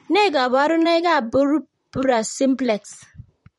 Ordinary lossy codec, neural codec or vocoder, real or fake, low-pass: MP3, 48 kbps; vocoder, 44.1 kHz, 128 mel bands, Pupu-Vocoder; fake; 19.8 kHz